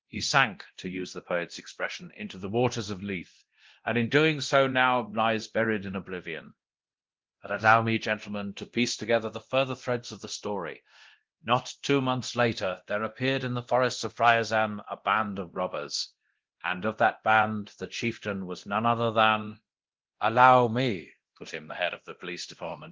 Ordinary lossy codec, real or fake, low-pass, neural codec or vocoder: Opus, 16 kbps; fake; 7.2 kHz; codec, 24 kHz, 0.9 kbps, DualCodec